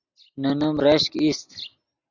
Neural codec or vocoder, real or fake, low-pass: none; real; 7.2 kHz